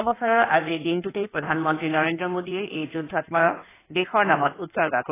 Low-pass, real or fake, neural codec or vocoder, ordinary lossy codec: 3.6 kHz; fake; codec, 16 kHz in and 24 kHz out, 1.1 kbps, FireRedTTS-2 codec; AAC, 16 kbps